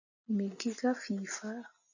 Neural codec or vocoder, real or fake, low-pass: none; real; 7.2 kHz